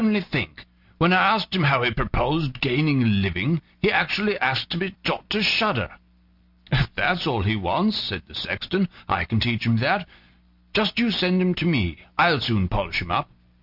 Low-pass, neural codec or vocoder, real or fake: 5.4 kHz; none; real